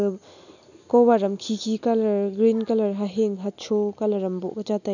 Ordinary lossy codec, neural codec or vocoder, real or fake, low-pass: none; none; real; 7.2 kHz